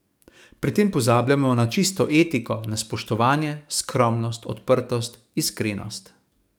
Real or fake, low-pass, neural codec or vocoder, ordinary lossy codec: fake; none; codec, 44.1 kHz, 7.8 kbps, DAC; none